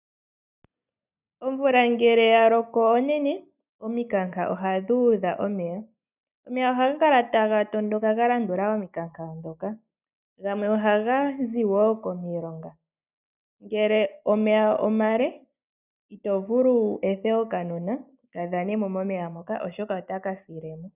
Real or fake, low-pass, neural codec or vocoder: real; 3.6 kHz; none